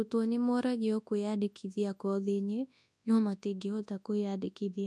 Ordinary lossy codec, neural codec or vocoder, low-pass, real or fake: none; codec, 24 kHz, 0.9 kbps, WavTokenizer, large speech release; none; fake